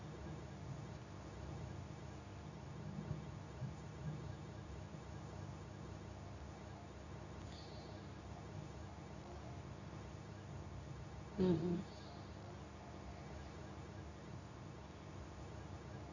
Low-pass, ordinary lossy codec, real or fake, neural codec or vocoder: 7.2 kHz; none; fake; codec, 24 kHz, 0.9 kbps, WavTokenizer, medium speech release version 2